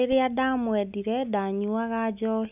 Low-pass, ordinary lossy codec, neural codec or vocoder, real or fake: 3.6 kHz; none; none; real